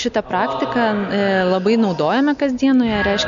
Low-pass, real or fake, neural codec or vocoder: 7.2 kHz; real; none